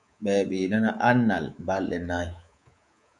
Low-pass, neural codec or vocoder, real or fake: 10.8 kHz; codec, 24 kHz, 3.1 kbps, DualCodec; fake